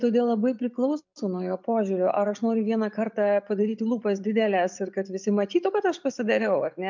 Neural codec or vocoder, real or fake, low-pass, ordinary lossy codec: none; real; 7.2 kHz; MP3, 64 kbps